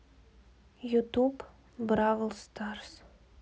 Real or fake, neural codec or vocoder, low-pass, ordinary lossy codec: real; none; none; none